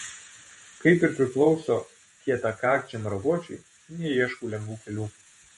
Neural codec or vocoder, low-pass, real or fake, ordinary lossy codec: vocoder, 48 kHz, 128 mel bands, Vocos; 19.8 kHz; fake; MP3, 48 kbps